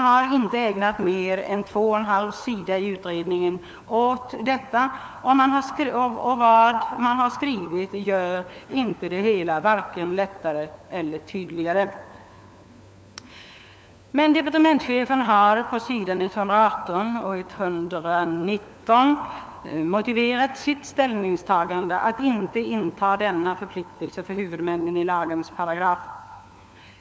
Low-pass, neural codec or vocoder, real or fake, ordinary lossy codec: none; codec, 16 kHz, 4 kbps, FunCodec, trained on LibriTTS, 50 frames a second; fake; none